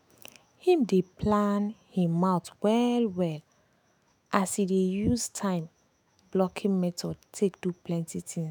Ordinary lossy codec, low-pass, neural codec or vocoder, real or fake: none; none; autoencoder, 48 kHz, 128 numbers a frame, DAC-VAE, trained on Japanese speech; fake